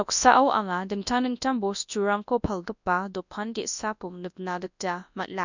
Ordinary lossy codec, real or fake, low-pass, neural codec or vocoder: none; fake; 7.2 kHz; codec, 24 kHz, 0.9 kbps, WavTokenizer, large speech release